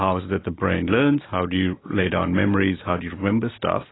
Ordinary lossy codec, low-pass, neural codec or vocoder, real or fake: AAC, 16 kbps; 7.2 kHz; none; real